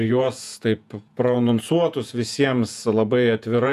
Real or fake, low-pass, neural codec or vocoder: fake; 14.4 kHz; vocoder, 48 kHz, 128 mel bands, Vocos